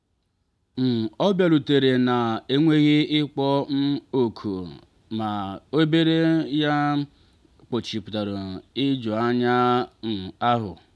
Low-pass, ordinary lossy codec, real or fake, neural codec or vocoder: none; none; real; none